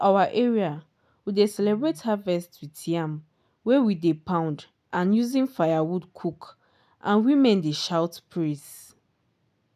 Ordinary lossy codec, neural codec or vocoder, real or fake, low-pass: none; none; real; 14.4 kHz